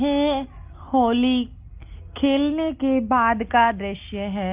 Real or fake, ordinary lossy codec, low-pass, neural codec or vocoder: real; Opus, 32 kbps; 3.6 kHz; none